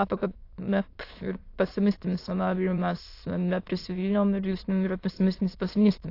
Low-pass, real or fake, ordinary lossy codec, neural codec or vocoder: 5.4 kHz; fake; AAC, 32 kbps; autoencoder, 22.05 kHz, a latent of 192 numbers a frame, VITS, trained on many speakers